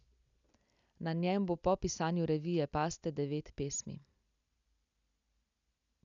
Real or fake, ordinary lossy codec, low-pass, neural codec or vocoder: real; none; 7.2 kHz; none